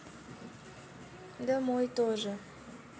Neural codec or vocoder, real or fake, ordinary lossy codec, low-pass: none; real; none; none